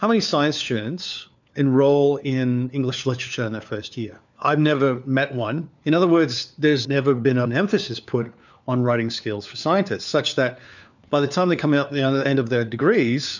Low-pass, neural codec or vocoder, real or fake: 7.2 kHz; codec, 16 kHz, 4 kbps, FunCodec, trained on LibriTTS, 50 frames a second; fake